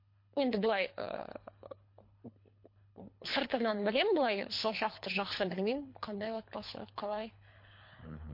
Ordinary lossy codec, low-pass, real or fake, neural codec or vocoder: MP3, 32 kbps; 5.4 kHz; fake; codec, 24 kHz, 3 kbps, HILCodec